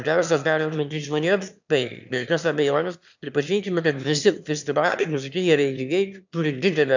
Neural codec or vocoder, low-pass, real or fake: autoencoder, 22.05 kHz, a latent of 192 numbers a frame, VITS, trained on one speaker; 7.2 kHz; fake